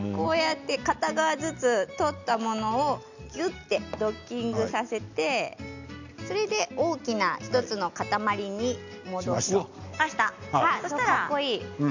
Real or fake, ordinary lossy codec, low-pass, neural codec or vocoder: real; none; 7.2 kHz; none